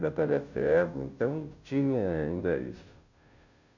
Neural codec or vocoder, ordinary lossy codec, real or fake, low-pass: codec, 16 kHz, 0.5 kbps, FunCodec, trained on Chinese and English, 25 frames a second; none; fake; 7.2 kHz